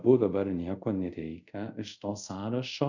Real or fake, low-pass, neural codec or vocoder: fake; 7.2 kHz; codec, 24 kHz, 0.5 kbps, DualCodec